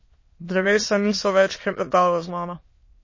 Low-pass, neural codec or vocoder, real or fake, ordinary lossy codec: 7.2 kHz; autoencoder, 22.05 kHz, a latent of 192 numbers a frame, VITS, trained on many speakers; fake; MP3, 32 kbps